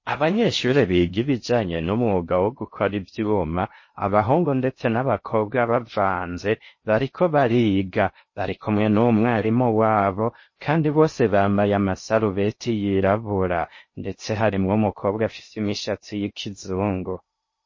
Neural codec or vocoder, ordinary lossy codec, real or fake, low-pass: codec, 16 kHz in and 24 kHz out, 0.6 kbps, FocalCodec, streaming, 4096 codes; MP3, 32 kbps; fake; 7.2 kHz